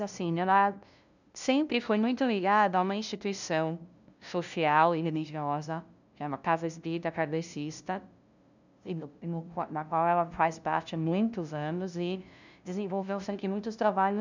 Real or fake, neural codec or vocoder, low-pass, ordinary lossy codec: fake; codec, 16 kHz, 0.5 kbps, FunCodec, trained on LibriTTS, 25 frames a second; 7.2 kHz; none